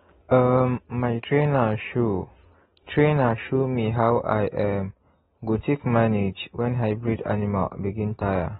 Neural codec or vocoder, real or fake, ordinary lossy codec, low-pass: none; real; AAC, 16 kbps; 19.8 kHz